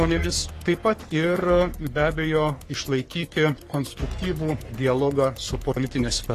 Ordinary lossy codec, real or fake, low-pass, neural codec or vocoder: AAC, 48 kbps; fake; 14.4 kHz; codec, 44.1 kHz, 3.4 kbps, Pupu-Codec